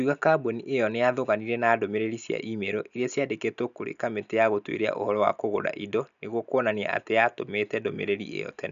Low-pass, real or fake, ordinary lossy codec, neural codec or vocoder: 7.2 kHz; real; none; none